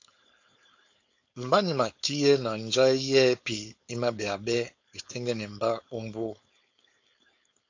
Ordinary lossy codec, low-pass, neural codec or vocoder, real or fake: AAC, 48 kbps; 7.2 kHz; codec, 16 kHz, 4.8 kbps, FACodec; fake